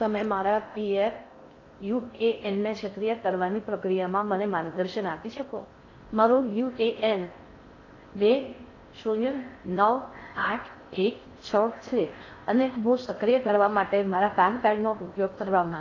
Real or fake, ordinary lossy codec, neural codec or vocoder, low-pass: fake; AAC, 32 kbps; codec, 16 kHz in and 24 kHz out, 0.6 kbps, FocalCodec, streaming, 4096 codes; 7.2 kHz